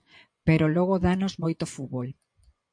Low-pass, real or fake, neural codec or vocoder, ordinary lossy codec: 9.9 kHz; fake; vocoder, 24 kHz, 100 mel bands, Vocos; MP3, 48 kbps